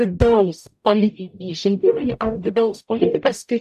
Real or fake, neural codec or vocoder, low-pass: fake; codec, 44.1 kHz, 0.9 kbps, DAC; 14.4 kHz